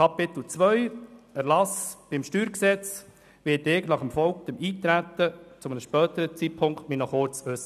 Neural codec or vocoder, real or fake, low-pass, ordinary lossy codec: none; real; 14.4 kHz; none